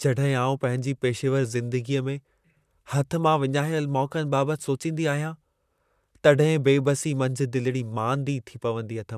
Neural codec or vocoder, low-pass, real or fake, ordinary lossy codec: vocoder, 44.1 kHz, 128 mel bands, Pupu-Vocoder; 14.4 kHz; fake; none